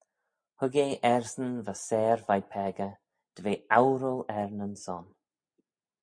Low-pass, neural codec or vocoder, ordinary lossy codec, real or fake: 9.9 kHz; none; MP3, 48 kbps; real